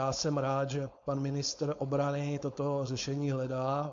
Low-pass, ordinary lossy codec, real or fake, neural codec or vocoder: 7.2 kHz; MP3, 48 kbps; fake; codec, 16 kHz, 4.8 kbps, FACodec